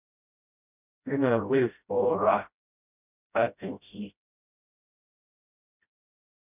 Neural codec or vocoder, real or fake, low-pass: codec, 16 kHz, 0.5 kbps, FreqCodec, smaller model; fake; 3.6 kHz